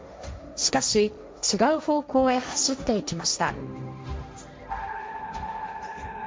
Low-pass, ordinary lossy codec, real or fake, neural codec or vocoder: none; none; fake; codec, 16 kHz, 1.1 kbps, Voila-Tokenizer